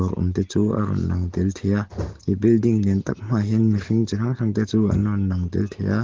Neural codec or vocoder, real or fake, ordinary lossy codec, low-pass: none; real; Opus, 16 kbps; 7.2 kHz